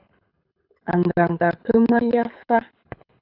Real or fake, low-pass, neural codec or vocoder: fake; 5.4 kHz; codec, 24 kHz, 6 kbps, HILCodec